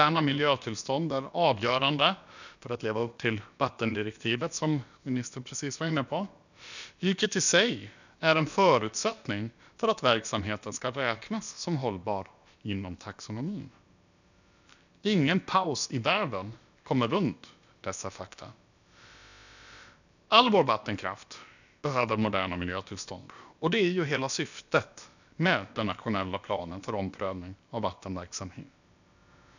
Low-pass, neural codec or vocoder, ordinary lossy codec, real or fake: 7.2 kHz; codec, 16 kHz, about 1 kbps, DyCAST, with the encoder's durations; none; fake